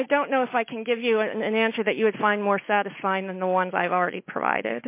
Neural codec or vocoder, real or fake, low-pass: none; real; 3.6 kHz